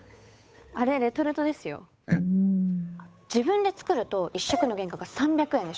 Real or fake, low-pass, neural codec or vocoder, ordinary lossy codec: fake; none; codec, 16 kHz, 8 kbps, FunCodec, trained on Chinese and English, 25 frames a second; none